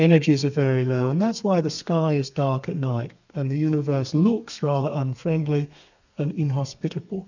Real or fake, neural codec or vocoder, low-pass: fake; codec, 32 kHz, 1.9 kbps, SNAC; 7.2 kHz